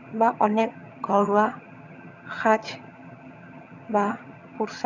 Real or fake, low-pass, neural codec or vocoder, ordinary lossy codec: fake; 7.2 kHz; vocoder, 22.05 kHz, 80 mel bands, HiFi-GAN; none